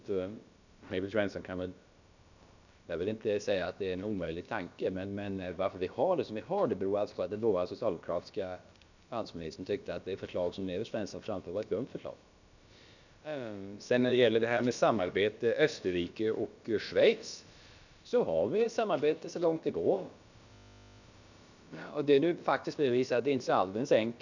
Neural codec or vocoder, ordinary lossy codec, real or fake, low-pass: codec, 16 kHz, about 1 kbps, DyCAST, with the encoder's durations; none; fake; 7.2 kHz